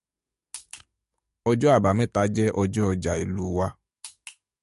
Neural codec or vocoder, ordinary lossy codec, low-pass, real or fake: codec, 44.1 kHz, 7.8 kbps, DAC; MP3, 48 kbps; 14.4 kHz; fake